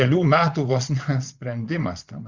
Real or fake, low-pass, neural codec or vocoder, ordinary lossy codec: fake; 7.2 kHz; vocoder, 22.05 kHz, 80 mel bands, Vocos; Opus, 64 kbps